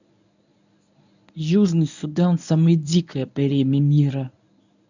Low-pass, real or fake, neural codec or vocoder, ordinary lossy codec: 7.2 kHz; fake; codec, 24 kHz, 0.9 kbps, WavTokenizer, medium speech release version 1; none